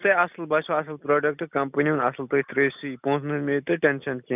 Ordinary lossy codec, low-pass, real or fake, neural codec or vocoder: none; 3.6 kHz; real; none